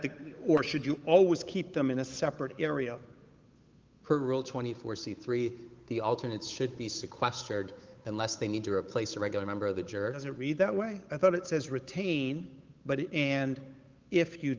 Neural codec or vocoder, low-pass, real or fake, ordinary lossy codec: codec, 16 kHz, 8 kbps, FunCodec, trained on Chinese and English, 25 frames a second; 7.2 kHz; fake; Opus, 24 kbps